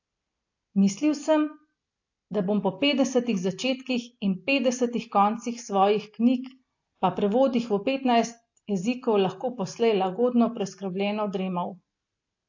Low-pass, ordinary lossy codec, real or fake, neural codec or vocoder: 7.2 kHz; AAC, 48 kbps; real; none